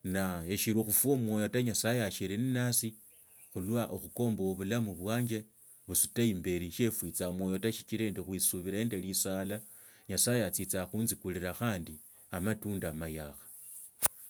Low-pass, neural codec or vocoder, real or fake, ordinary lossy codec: none; none; real; none